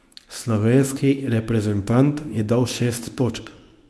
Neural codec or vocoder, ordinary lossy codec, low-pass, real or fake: codec, 24 kHz, 0.9 kbps, WavTokenizer, medium speech release version 1; none; none; fake